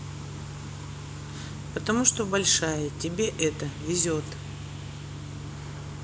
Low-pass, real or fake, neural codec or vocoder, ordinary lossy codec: none; real; none; none